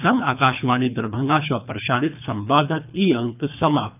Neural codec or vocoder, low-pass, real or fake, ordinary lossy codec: codec, 24 kHz, 3 kbps, HILCodec; 3.6 kHz; fake; none